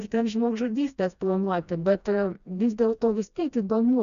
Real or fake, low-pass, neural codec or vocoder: fake; 7.2 kHz; codec, 16 kHz, 1 kbps, FreqCodec, smaller model